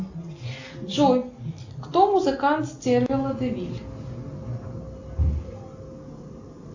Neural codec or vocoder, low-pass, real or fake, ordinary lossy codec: none; 7.2 kHz; real; AAC, 48 kbps